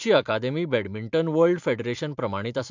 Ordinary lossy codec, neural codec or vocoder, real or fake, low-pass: MP3, 64 kbps; vocoder, 44.1 kHz, 128 mel bands every 512 samples, BigVGAN v2; fake; 7.2 kHz